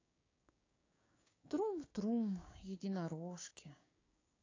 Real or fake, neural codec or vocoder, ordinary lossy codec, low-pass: fake; codec, 16 kHz, 6 kbps, DAC; AAC, 32 kbps; 7.2 kHz